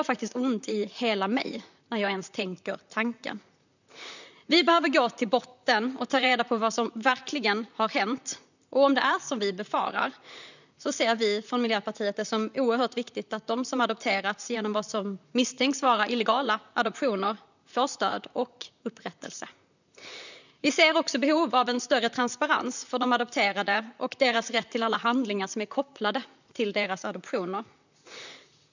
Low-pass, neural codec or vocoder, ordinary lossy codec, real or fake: 7.2 kHz; vocoder, 44.1 kHz, 128 mel bands, Pupu-Vocoder; none; fake